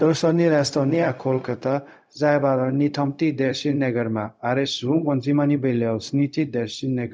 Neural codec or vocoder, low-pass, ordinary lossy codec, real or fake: codec, 16 kHz, 0.4 kbps, LongCat-Audio-Codec; none; none; fake